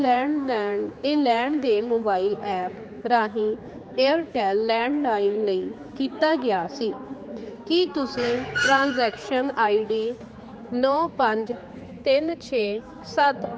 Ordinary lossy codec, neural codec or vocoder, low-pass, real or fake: none; codec, 16 kHz, 4 kbps, X-Codec, HuBERT features, trained on general audio; none; fake